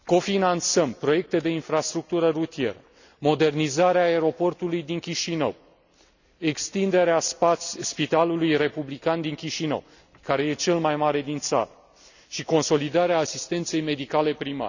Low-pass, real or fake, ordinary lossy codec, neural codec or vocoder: 7.2 kHz; real; none; none